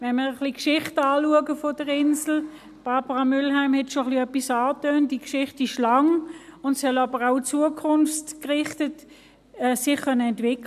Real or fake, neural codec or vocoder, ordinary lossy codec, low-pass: real; none; none; 14.4 kHz